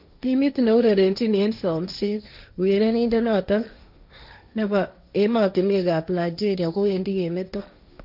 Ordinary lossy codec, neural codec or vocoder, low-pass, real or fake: none; codec, 16 kHz, 1.1 kbps, Voila-Tokenizer; 5.4 kHz; fake